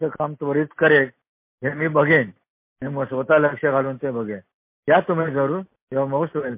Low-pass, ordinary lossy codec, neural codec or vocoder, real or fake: 3.6 kHz; MP3, 24 kbps; none; real